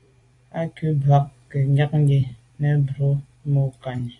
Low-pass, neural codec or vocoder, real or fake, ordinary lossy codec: 10.8 kHz; vocoder, 44.1 kHz, 128 mel bands every 256 samples, BigVGAN v2; fake; AAC, 48 kbps